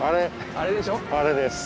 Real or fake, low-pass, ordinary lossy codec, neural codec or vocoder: real; none; none; none